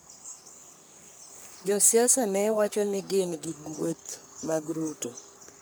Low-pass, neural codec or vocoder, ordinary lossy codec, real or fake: none; codec, 44.1 kHz, 3.4 kbps, Pupu-Codec; none; fake